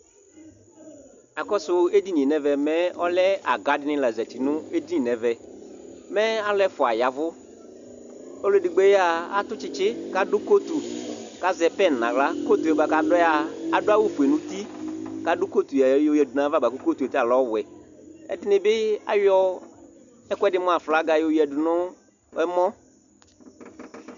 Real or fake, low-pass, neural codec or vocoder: real; 7.2 kHz; none